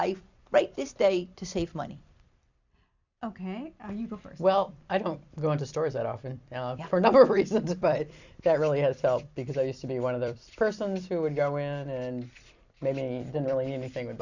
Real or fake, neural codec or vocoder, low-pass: fake; vocoder, 44.1 kHz, 128 mel bands every 256 samples, BigVGAN v2; 7.2 kHz